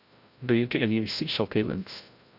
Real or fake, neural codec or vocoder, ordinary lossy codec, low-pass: fake; codec, 16 kHz, 0.5 kbps, FreqCodec, larger model; none; 5.4 kHz